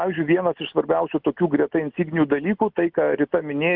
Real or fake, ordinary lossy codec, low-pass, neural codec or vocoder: real; Opus, 24 kbps; 5.4 kHz; none